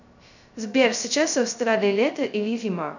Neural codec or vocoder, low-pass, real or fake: codec, 16 kHz, 0.2 kbps, FocalCodec; 7.2 kHz; fake